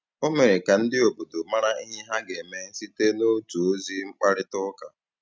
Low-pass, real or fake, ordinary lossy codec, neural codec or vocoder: none; real; none; none